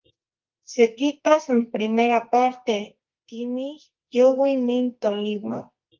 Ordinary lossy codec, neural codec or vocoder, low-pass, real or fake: Opus, 24 kbps; codec, 24 kHz, 0.9 kbps, WavTokenizer, medium music audio release; 7.2 kHz; fake